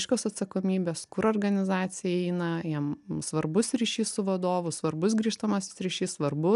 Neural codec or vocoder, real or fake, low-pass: none; real; 10.8 kHz